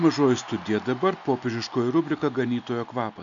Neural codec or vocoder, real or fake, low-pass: none; real; 7.2 kHz